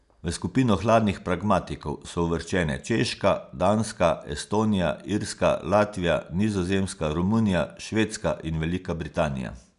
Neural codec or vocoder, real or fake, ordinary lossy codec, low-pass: none; real; none; 10.8 kHz